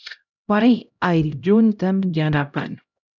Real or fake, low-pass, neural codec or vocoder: fake; 7.2 kHz; codec, 16 kHz, 0.5 kbps, X-Codec, HuBERT features, trained on LibriSpeech